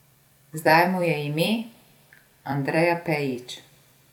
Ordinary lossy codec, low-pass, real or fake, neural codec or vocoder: none; 19.8 kHz; fake; vocoder, 44.1 kHz, 128 mel bands every 256 samples, BigVGAN v2